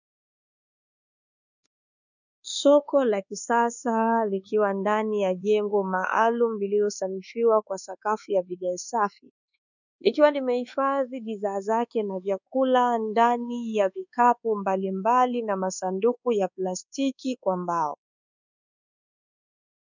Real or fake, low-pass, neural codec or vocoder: fake; 7.2 kHz; codec, 24 kHz, 1.2 kbps, DualCodec